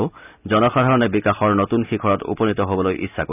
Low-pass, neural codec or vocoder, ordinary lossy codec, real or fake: 3.6 kHz; none; none; real